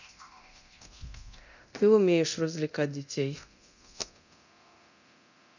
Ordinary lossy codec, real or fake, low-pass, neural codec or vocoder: none; fake; 7.2 kHz; codec, 24 kHz, 0.9 kbps, DualCodec